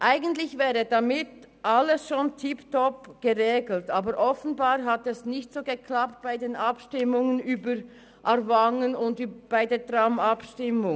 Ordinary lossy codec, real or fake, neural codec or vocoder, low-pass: none; real; none; none